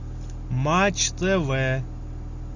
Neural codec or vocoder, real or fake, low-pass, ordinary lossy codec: none; real; 7.2 kHz; Opus, 64 kbps